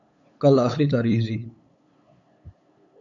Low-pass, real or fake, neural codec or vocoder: 7.2 kHz; fake; codec, 16 kHz, 8 kbps, FunCodec, trained on LibriTTS, 25 frames a second